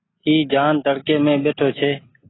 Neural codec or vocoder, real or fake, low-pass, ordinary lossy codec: none; real; 7.2 kHz; AAC, 16 kbps